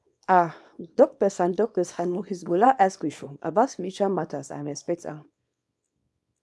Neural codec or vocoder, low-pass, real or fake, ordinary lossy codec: codec, 24 kHz, 0.9 kbps, WavTokenizer, small release; none; fake; none